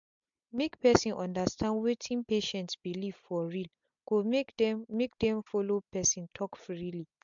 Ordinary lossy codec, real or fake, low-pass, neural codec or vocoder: MP3, 64 kbps; real; 7.2 kHz; none